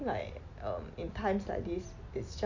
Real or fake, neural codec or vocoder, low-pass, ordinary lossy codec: real; none; 7.2 kHz; Opus, 64 kbps